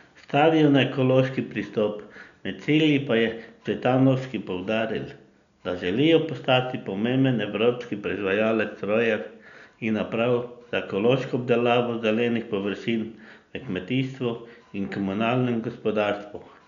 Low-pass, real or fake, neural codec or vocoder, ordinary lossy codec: 7.2 kHz; real; none; none